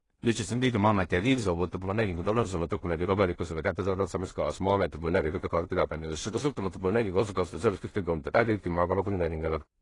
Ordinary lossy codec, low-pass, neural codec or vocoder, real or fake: AAC, 32 kbps; 10.8 kHz; codec, 16 kHz in and 24 kHz out, 0.4 kbps, LongCat-Audio-Codec, two codebook decoder; fake